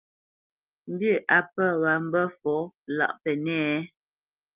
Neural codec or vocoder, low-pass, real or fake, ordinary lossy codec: none; 3.6 kHz; real; Opus, 24 kbps